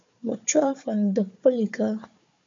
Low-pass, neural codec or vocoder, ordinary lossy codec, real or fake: 7.2 kHz; codec, 16 kHz, 4 kbps, FunCodec, trained on Chinese and English, 50 frames a second; AAC, 48 kbps; fake